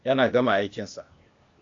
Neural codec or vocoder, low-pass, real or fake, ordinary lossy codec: codec, 16 kHz, 0.8 kbps, ZipCodec; 7.2 kHz; fake; AAC, 48 kbps